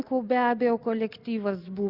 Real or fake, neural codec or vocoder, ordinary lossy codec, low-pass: real; none; Opus, 64 kbps; 5.4 kHz